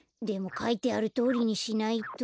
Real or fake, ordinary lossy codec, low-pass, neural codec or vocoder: real; none; none; none